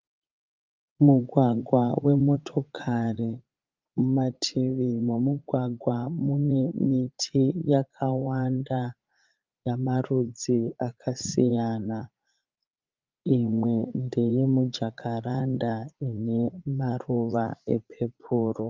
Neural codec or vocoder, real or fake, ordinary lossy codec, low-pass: vocoder, 44.1 kHz, 80 mel bands, Vocos; fake; Opus, 24 kbps; 7.2 kHz